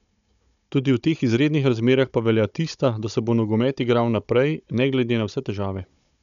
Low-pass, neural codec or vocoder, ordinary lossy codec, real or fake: 7.2 kHz; codec, 16 kHz, 16 kbps, FunCodec, trained on Chinese and English, 50 frames a second; none; fake